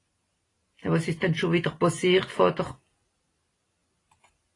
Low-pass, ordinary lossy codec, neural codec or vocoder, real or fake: 10.8 kHz; AAC, 32 kbps; none; real